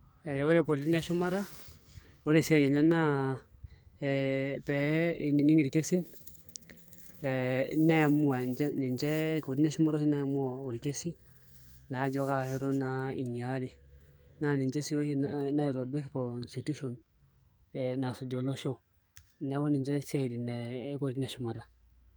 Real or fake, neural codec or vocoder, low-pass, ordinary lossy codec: fake; codec, 44.1 kHz, 2.6 kbps, SNAC; none; none